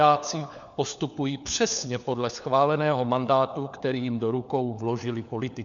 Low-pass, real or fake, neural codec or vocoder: 7.2 kHz; fake; codec, 16 kHz, 4 kbps, FunCodec, trained on LibriTTS, 50 frames a second